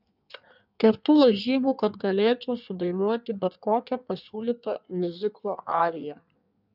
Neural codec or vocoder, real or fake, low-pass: codec, 16 kHz in and 24 kHz out, 1.1 kbps, FireRedTTS-2 codec; fake; 5.4 kHz